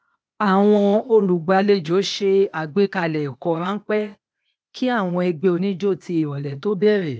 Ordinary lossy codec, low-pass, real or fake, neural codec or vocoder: none; none; fake; codec, 16 kHz, 0.8 kbps, ZipCodec